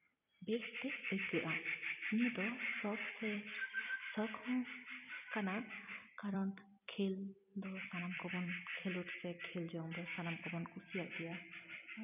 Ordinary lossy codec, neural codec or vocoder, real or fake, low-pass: none; vocoder, 44.1 kHz, 128 mel bands every 256 samples, BigVGAN v2; fake; 3.6 kHz